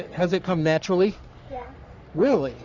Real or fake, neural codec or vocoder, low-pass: fake; codec, 44.1 kHz, 3.4 kbps, Pupu-Codec; 7.2 kHz